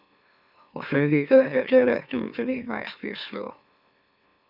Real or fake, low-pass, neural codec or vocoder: fake; 5.4 kHz; autoencoder, 44.1 kHz, a latent of 192 numbers a frame, MeloTTS